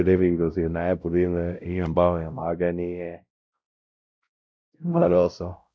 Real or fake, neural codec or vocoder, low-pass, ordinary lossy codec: fake; codec, 16 kHz, 0.5 kbps, X-Codec, WavLM features, trained on Multilingual LibriSpeech; none; none